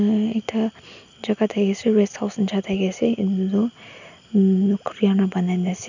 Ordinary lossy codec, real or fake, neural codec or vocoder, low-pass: none; real; none; 7.2 kHz